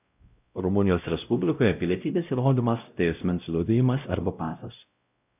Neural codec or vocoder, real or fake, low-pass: codec, 16 kHz, 0.5 kbps, X-Codec, WavLM features, trained on Multilingual LibriSpeech; fake; 3.6 kHz